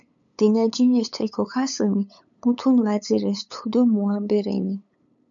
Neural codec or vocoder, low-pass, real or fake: codec, 16 kHz, 8 kbps, FunCodec, trained on LibriTTS, 25 frames a second; 7.2 kHz; fake